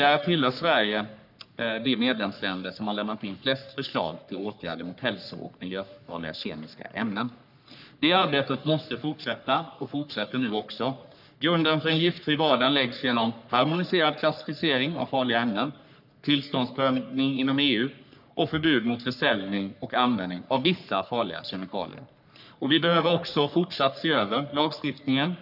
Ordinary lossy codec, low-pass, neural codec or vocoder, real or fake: none; 5.4 kHz; codec, 44.1 kHz, 3.4 kbps, Pupu-Codec; fake